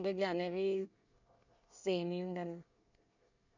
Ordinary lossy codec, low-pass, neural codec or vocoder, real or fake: none; 7.2 kHz; codec, 24 kHz, 1 kbps, SNAC; fake